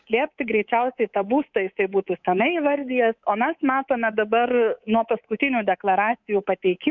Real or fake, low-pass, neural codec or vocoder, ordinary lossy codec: fake; 7.2 kHz; codec, 24 kHz, 3.1 kbps, DualCodec; MP3, 64 kbps